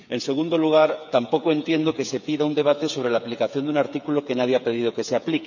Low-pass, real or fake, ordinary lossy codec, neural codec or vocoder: 7.2 kHz; fake; none; codec, 16 kHz, 8 kbps, FreqCodec, smaller model